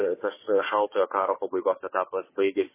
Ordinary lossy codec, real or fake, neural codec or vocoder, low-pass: MP3, 16 kbps; fake; codec, 16 kHz, 4 kbps, FunCodec, trained on Chinese and English, 50 frames a second; 3.6 kHz